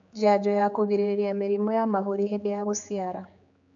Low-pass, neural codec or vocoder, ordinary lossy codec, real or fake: 7.2 kHz; codec, 16 kHz, 4 kbps, X-Codec, HuBERT features, trained on general audio; AAC, 64 kbps; fake